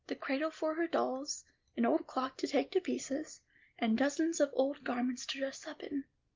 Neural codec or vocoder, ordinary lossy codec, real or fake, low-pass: none; Opus, 32 kbps; real; 7.2 kHz